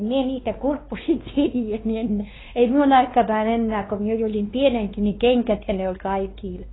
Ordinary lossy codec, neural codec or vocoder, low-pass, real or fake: AAC, 16 kbps; codec, 16 kHz, 2 kbps, X-Codec, WavLM features, trained on Multilingual LibriSpeech; 7.2 kHz; fake